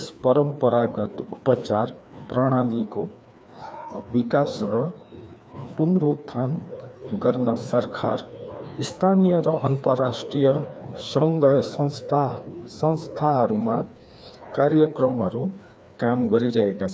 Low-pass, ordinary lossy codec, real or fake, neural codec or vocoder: none; none; fake; codec, 16 kHz, 2 kbps, FreqCodec, larger model